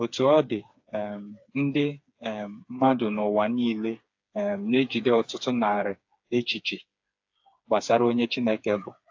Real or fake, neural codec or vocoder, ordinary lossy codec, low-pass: fake; codec, 16 kHz, 4 kbps, FreqCodec, smaller model; AAC, 48 kbps; 7.2 kHz